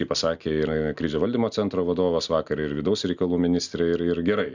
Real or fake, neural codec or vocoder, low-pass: real; none; 7.2 kHz